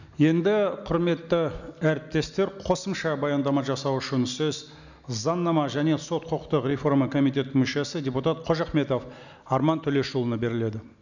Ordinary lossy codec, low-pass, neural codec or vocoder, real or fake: none; 7.2 kHz; none; real